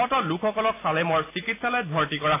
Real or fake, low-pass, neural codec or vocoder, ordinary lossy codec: real; 3.6 kHz; none; AAC, 24 kbps